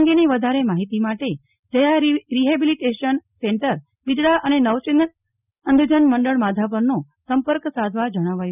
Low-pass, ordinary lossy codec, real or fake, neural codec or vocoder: 3.6 kHz; none; real; none